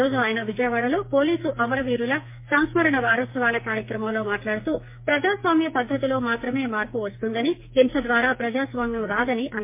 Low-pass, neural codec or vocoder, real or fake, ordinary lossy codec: 3.6 kHz; codec, 44.1 kHz, 2.6 kbps, SNAC; fake; MP3, 24 kbps